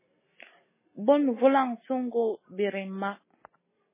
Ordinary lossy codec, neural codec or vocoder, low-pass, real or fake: MP3, 16 kbps; none; 3.6 kHz; real